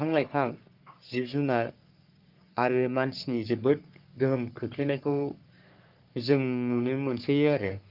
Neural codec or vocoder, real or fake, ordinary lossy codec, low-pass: codec, 44.1 kHz, 3.4 kbps, Pupu-Codec; fake; Opus, 24 kbps; 5.4 kHz